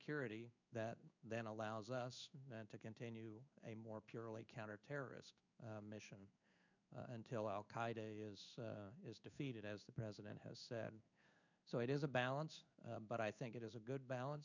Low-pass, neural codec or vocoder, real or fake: 7.2 kHz; codec, 16 kHz in and 24 kHz out, 1 kbps, XY-Tokenizer; fake